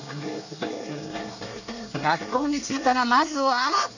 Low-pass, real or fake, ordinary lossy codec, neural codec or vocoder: 7.2 kHz; fake; none; codec, 24 kHz, 1 kbps, SNAC